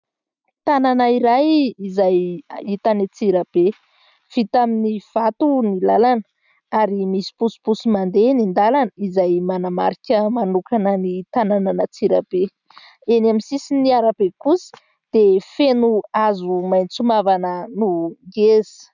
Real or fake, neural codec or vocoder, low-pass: real; none; 7.2 kHz